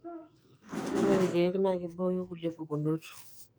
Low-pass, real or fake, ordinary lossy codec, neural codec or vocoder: none; fake; none; codec, 44.1 kHz, 2.6 kbps, SNAC